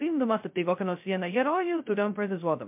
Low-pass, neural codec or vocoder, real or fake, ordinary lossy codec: 3.6 kHz; codec, 16 kHz, 0.2 kbps, FocalCodec; fake; MP3, 32 kbps